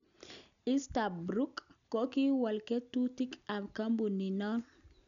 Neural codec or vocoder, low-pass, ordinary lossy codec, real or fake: none; 7.2 kHz; none; real